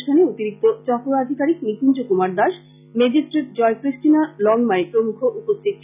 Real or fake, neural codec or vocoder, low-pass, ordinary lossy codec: real; none; 3.6 kHz; none